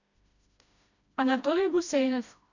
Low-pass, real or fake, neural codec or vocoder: 7.2 kHz; fake; codec, 16 kHz, 1 kbps, FreqCodec, smaller model